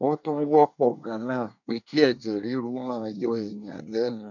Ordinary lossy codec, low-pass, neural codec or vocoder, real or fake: none; 7.2 kHz; codec, 24 kHz, 1 kbps, SNAC; fake